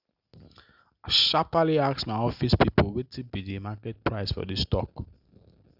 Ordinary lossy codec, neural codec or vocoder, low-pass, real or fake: Opus, 64 kbps; none; 5.4 kHz; real